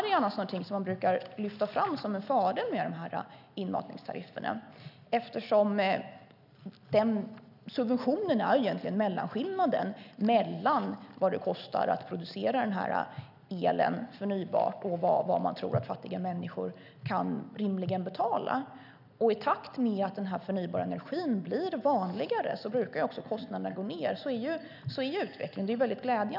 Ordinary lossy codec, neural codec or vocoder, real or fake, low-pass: none; none; real; 5.4 kHz